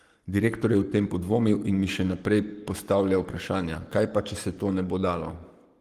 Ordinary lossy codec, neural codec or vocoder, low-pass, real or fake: Opus, 24 kbps; codec, 44.1 kHz, 7.8 kbps, Pupu-Codec; 14.4 kHz; fake